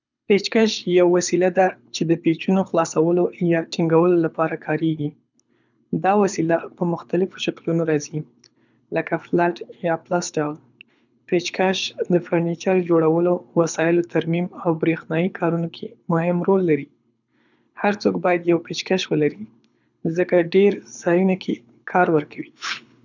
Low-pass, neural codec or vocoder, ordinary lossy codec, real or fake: 7.2 kHz; codec, 24 kHz, 6 kbps, HILCodec; none; fake